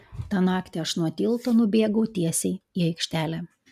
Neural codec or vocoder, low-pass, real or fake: none; 14.4 kHz; real